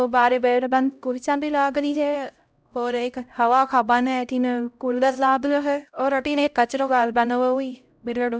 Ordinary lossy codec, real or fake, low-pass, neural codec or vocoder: none; fake; none; codec, 16 kHz, 0.5 kbps, X-Codec, HuBERT features, trained on LibriSpeech